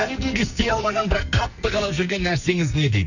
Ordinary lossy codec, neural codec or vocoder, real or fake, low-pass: none; codec, 44.1 kHz, 2.6 kbps, SNAC; fake; 7.2 kHz